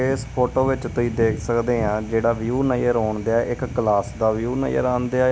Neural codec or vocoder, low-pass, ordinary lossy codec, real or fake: none; none; none; real